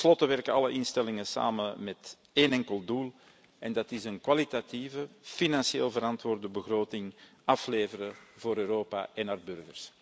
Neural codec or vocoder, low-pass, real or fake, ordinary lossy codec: none; none; real; none